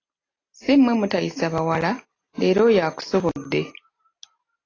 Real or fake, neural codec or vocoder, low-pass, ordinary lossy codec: real; none; 7.2 kHz; AAC, 32 kbps